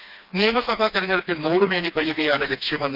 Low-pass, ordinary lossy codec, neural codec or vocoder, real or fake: 5.4 kHz; none; codec, 16 kHz, 2 kbps, FreqCodec, smaller model; fake